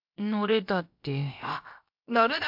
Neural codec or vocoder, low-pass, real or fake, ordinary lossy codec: codec, 16 kHz, about 1 kbps, DyCAST, with the encoder's durations; 5.4 kHz; fake; MP3, 48 kbps